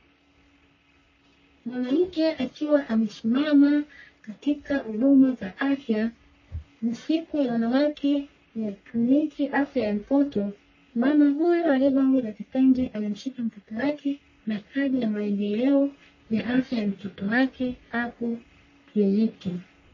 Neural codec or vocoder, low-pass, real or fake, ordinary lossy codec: codec, 44.1 kHz, 1.7 kbps, Pupu-Codec; 7.2 kHz; fake; MP3, 32 kbps